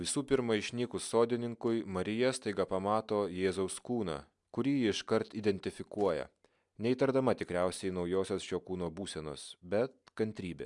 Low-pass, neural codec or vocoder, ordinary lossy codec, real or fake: 10.8 kHz; none; MP3, 96 kbps; real